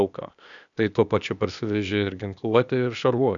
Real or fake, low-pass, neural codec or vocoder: fake; 7.2 kHz; codec, 16 kHz, 0.8 kbps, ZipCodec